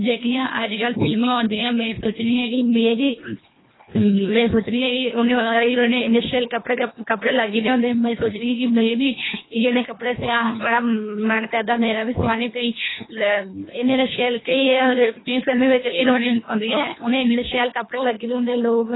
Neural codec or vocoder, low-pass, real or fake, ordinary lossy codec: codec, 24 kHz, 1.5 kbps, HILCodec; 7.2 kHz; fake; AAC, 16 kbps